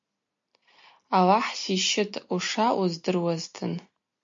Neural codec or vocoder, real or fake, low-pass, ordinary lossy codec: none; real; 7.2 kHz; MP3, 48 kbps